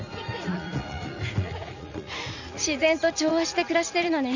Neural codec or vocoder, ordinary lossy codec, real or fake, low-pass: vocoder, 44.1 kHz, 80 mel bands, Vocos; none; fake; 7.2 kHz